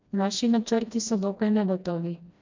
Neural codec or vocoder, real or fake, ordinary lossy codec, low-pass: codec, 16 kHz, 1 kbps, FreqCodec, smaller model; fake; MP3, 64 kbps; 7.2 kHz